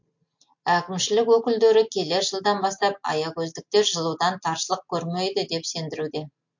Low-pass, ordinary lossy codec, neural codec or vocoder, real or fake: 7.2 kHz; MP3, 48 kbps; none; real